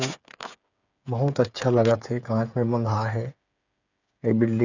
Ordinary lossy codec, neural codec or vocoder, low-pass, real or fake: none; codec, 16 kHz, 8 kbps, FreqCodec, smaller model; 7.2 kHz; fake